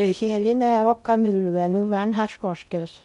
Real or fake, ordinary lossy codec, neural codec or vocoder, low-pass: fake; none; codec, 16 kHz in and 24 kHz out, 0.6 kbps, FocalCodec, streaming, 2048 codes; 10.8 kHz